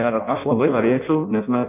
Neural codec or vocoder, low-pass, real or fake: codec, 16 kHz in and 24 kHz out, 0.6 kbps, FireRedTTS-2 codec; 3.6 kHz; fake